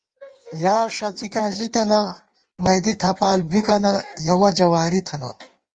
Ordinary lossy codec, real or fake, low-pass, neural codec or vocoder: Opus, 32 kbps; fake; 9.9 kHz; codec, 16 kHz in and 24 kHz out, 1.1 kbps, FireRedTTS-2 codec